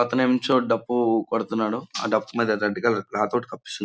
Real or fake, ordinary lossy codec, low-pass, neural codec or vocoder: real; none; none; none